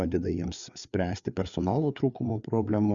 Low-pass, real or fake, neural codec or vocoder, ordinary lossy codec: 7.2 kHz; fake; codec, 16 kHz, 4 kbps, FreqCodec, larger model; Opus, 64 kbps